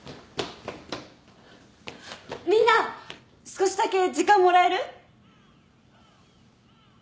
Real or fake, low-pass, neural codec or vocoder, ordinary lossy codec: real; none; none; none